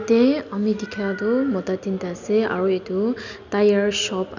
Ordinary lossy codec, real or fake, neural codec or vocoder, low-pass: none; real; none; 7.2 kHz